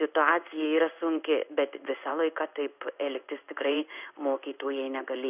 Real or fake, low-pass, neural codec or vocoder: fake; 3.6 kHz; codec, 16 kHz in and 24 kHz out, 1 kbps, XY-Tokenizer